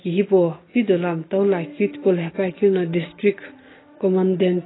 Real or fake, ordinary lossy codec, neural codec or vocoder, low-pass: real; AAC, 16 kbps; none; 7.2 kHz